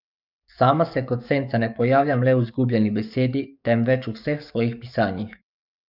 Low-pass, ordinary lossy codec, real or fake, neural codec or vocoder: 5.4 kHz; none; fake; codec, 44.1 kHz, 7.8 kbps, DAC